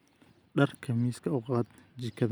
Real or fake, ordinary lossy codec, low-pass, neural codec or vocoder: real; none; none; none